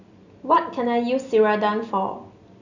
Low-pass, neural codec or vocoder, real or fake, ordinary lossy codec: 7.2 kHz; none; real; none